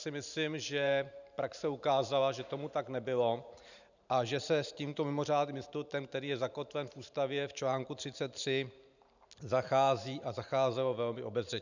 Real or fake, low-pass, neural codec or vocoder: fake; 7.2 kHz; vocoder, 44.1 kHz, 128 mel bands every 512 samples, BigVGAN v2